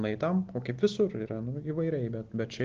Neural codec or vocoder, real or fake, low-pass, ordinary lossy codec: none; real; 7.2 kHz; Opus, 16 kbps